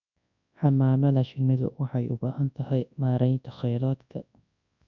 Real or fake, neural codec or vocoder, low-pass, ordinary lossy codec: fake; codec, 24 kHz, 0.9 kbps, WavTokenizer, large speech release; 7.2 kHz; none